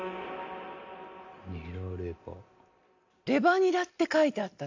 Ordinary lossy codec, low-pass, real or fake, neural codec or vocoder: AAC, 48 kbps; 7.2 kHz; real; none